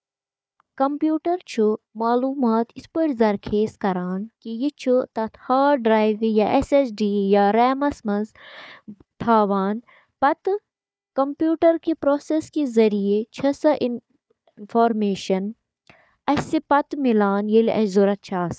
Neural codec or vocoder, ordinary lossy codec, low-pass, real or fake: codec, 16 kHz, 4 kbps, FunCodec, trained on Chinese and English, 50 frames a second; none; none; fake